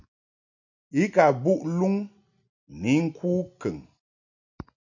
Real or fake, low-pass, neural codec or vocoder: real; 7.2 kHz; none